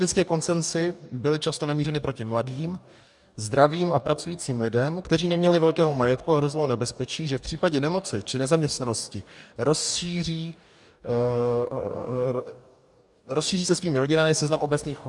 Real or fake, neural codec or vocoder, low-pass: fake; codec, 44.1 kHz, 2.6 kbps, DAC; 10.8 kHz